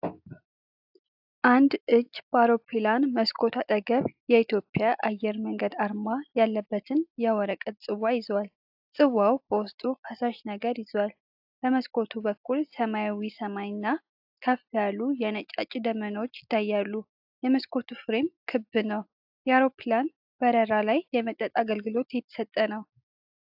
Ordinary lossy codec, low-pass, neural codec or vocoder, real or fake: AAC, 48 kbps; 5.4 kHz; none; real